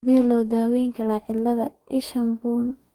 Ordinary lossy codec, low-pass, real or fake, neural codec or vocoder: Opus, 32 kbps; 19.8 kHz; fake; codec, 44.1 kHz, 2.6 kbps, DAC